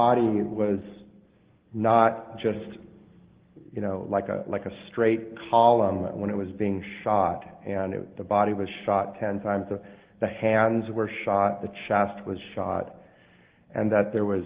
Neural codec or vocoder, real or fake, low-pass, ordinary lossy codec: none; real; 3.6 kHz; Opus, 16 kbps